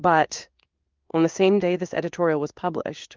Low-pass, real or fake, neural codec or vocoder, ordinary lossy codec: 7.2 kHz; fake; codec, 16 kHz in and 24 kHz out, 1 kbps, XY-Tokenizer; Opus, 24 kbps